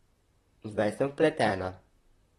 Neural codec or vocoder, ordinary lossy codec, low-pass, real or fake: vocoder, 44.1 kHz, 128 mel bands, Pupu-Vocoder; AAC, 32 kbps; 19.8 kHz; fake